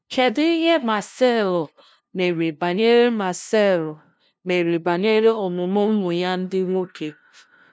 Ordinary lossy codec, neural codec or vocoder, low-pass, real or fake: none; codec, 16 kHz, 0.5 kbps, FunCodec, trained on LibriTTS, 25 frames a second; none; fake